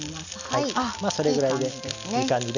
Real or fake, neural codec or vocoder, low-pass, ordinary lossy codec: real; none; 7.2 kHz; none